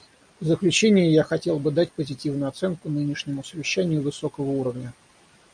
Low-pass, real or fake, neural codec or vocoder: 9.9 kHz; real; none